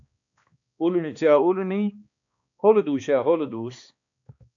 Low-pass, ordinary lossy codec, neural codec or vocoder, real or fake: 7.2 kHz; MP3, 64 kbps; codec, 16 kHz, 2 kbps, X-Codec, HuBERT features, trained on balanced general audio; fake